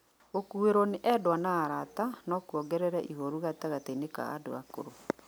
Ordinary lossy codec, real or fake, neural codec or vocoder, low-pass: none; real; none; none